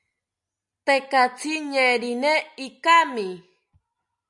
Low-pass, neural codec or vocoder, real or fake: 10.8 kHz; none; real